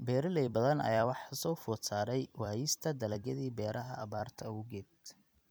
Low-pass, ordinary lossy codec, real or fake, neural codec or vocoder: none; none; fake; vocoder, 44.1 kHz, 128 mel bands every 256 samples, BigVGAN v2